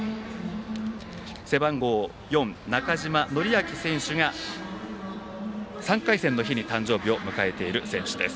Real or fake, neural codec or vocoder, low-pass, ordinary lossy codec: real; none; none; none